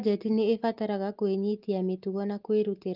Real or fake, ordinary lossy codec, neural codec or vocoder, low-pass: real; Opus, 32 kbps; none; 5.4 kHz